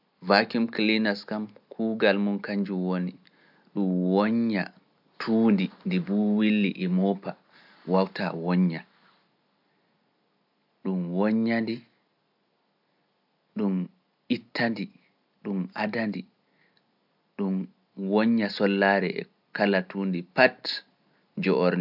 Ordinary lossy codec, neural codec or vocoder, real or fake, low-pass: AAC, 48 kbps; none; real; 5.4 kHz